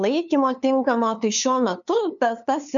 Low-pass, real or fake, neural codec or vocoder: 7.2 kHz; fake; codec, 16 kHz, 2 kbps, FunCodec, trained on LibriTTS, 25 frames a second